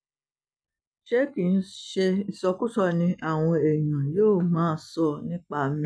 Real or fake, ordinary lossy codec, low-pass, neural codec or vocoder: real; none; none; none